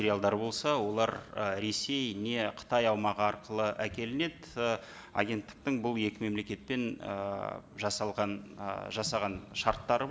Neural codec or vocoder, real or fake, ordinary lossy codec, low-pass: none; real; none; none